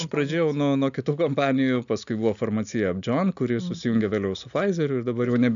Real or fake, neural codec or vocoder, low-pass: real; none; 7.2 kHz